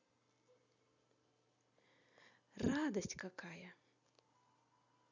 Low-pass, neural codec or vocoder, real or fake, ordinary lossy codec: 7.2 kHz; none; real; none